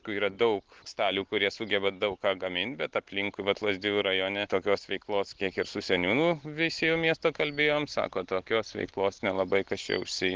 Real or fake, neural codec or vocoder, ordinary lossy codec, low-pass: real; none; Opus, 16 kbps; 7.2 kHz